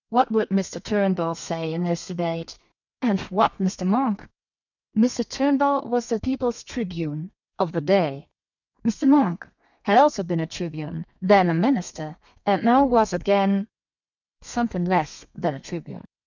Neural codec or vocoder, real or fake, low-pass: codec, 32 kHz, 1.9 kbps, SNAC; fake; 7.2 kHz